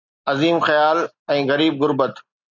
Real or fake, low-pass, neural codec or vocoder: real; 7.2 kHz; none